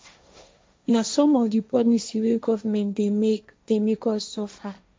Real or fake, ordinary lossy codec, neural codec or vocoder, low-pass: fake; none; codec, 16 kHz, 1.1 kbps, Voila-Tokenizer; none